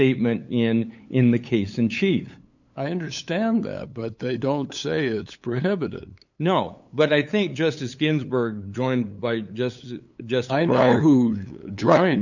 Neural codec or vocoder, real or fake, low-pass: codec, 16 kHz, 8 kbps, FunCodec, trained on LibriTTS, 25 frames a second; fake; 7.2 kHz